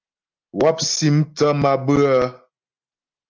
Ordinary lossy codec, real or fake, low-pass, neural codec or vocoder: Opus, 24 kbps; real; 7.2 kHz; none